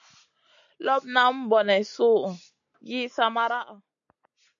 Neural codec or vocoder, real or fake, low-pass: none; real; 7.2 kHz